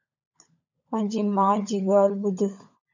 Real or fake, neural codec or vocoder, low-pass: fake; codec, 16 kHz, 16 kbps, FunCodec, trained on LibriTTS, 50 frames a second; 7.2 kHz